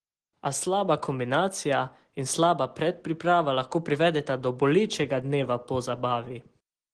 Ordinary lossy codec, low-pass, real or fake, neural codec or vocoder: Opus, 16 kbps; 10.8 kHz; real; none